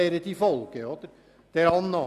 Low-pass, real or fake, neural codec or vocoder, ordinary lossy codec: 14.4 kHz; real; none; none